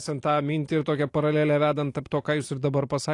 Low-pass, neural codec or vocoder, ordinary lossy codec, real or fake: 10.8 kHz; none; AAC, 48 kbps; real